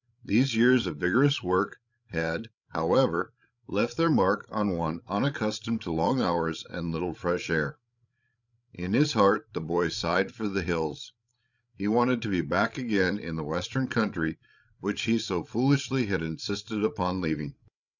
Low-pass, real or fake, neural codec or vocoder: 7.2 kHz; fake; codec, 16 kHz, 16 kbps, FreqCodec, larger model